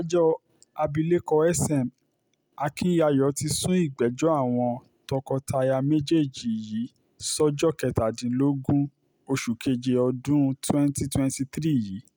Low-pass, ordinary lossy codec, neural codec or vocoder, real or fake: none; none; none; real